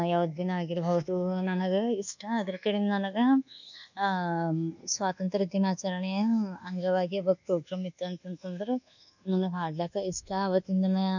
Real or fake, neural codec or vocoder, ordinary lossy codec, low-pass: fake; codec, 24 kHz, 1.2 kbps, DualCodec; none; 7.2 kHz